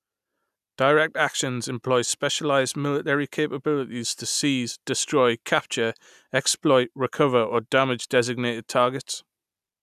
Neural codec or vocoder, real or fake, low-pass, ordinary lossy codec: none; real; 14.4 kHz; none